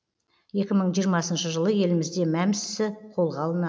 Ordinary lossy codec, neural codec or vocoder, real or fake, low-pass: none; none; real; none